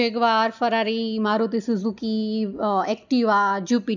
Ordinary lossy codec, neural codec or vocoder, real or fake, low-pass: none; none; real; 7.2 kHz